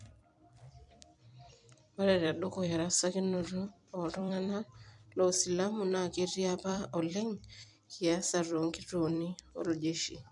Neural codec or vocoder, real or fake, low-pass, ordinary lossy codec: vocoder, 44.1 kHz, 128 mel bands every 256 samples, BigVGAN v2; fake; 10.8 kHz; MP3, 64 kbps